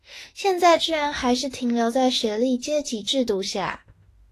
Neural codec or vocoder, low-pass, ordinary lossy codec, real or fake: autoencoder, 48 kHz, 32 numbers a frame, DAC-VAE, trained on Japanese speech; 14.4 kHz; AAC, 48 kbps; fake